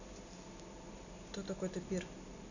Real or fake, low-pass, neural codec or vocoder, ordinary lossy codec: real; 7.2 kHz; none; none